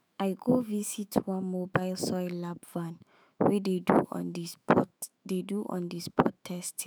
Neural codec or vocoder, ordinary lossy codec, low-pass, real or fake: autoencoder, 48 kHz, 128 numbers a frame, DAC-VAE, trained on Japanese speech; none; none; fake